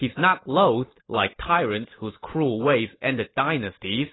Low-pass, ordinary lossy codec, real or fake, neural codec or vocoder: 7.2 kHz; AAC, 16 kbps; real; none